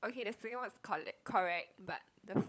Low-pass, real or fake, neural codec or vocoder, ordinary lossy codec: none; fake; codec, 16 kHz, 16 kbps, FunCodec, trained on LibriTTS, 50 frames a second; none